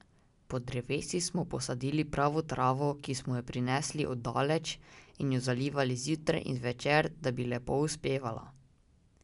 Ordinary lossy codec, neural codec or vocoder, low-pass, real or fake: none; none; 10.8 kHz; real